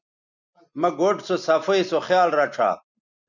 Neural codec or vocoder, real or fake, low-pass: none; real; 7.2 kHz